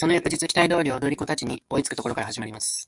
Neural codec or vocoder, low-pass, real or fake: codec, 44.1 kHz, 7.8 kbps, DAC; 10.8 kHz; fake